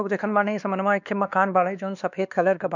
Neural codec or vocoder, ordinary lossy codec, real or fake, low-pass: codec, 16 kHz, 2 kbps, X-Codec, WavLM features, trained on Multilingual LibriSpeech; none; fake; 7.2 kHz